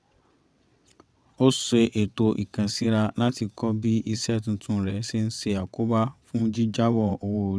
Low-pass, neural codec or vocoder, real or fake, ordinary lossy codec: none; vocoder, 22.05 kHz, 80 mel bands, WaveNeXt; fake; none